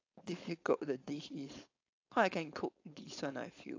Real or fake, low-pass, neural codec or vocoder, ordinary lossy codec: fake; 7.2 kHz; codec, 16 kHz, 4.8 kbps, FACodec; AAC, 48 kbps